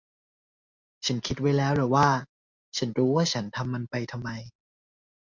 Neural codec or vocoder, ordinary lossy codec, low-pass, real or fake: none; MP3, 64 kbps; 7.2 kHz; real